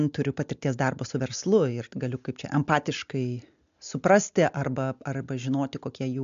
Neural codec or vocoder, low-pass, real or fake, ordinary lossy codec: none; 7.2 kHz; real; MP3, 64 kbps